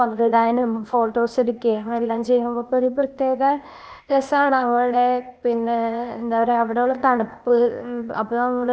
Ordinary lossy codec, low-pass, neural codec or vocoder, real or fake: none; none; codec, 16 kHz, 0.8 kbps, ZipCodec; fake